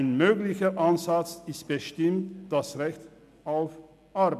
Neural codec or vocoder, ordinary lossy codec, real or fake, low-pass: none; none; real; 14.4 kHz